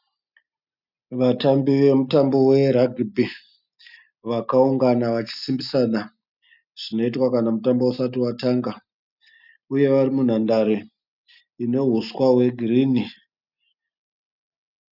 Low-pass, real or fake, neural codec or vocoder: 5.4 kHz; real; none